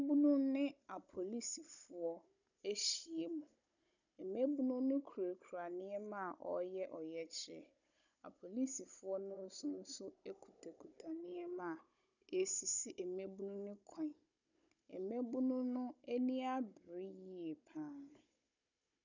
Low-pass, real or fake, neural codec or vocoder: 7.2 kHz; fake; codec, 16 kHz, 16 kbps, FunCodec, trained on Chinese and English, 50 frames a second